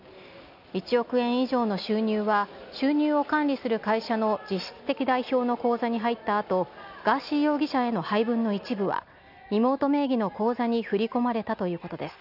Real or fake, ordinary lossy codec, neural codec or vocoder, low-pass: real; none; none; 5.4 kHz